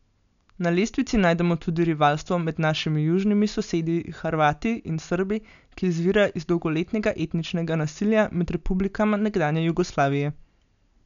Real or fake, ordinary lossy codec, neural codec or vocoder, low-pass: real; none; none; 7.2 kHz